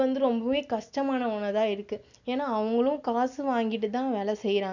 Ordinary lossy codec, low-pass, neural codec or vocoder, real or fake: none; 7.2 kHz; none; real